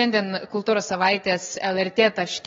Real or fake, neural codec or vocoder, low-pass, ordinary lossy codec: fake; codec, 16 kHz, 6 kbps, DAC; 7.2 kHz; AAC, 24 kbps